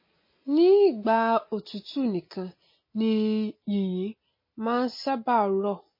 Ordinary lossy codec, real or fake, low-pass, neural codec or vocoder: MP3, 24 kbps; real; 5.4 kHz; none